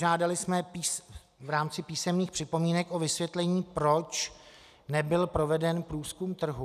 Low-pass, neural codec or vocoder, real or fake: 14.4 kHz; none; real